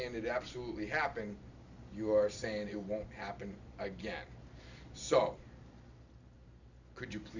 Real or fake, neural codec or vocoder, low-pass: real; none; 7.2 kHz